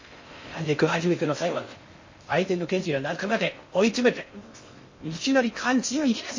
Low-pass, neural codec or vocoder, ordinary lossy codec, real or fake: 7.2 kHz; codec, 16 kHz in and 24 kHz out, 0.6 kbps, FocalCodec, streaming, 4096 codes; MP3, 32 kbps; fake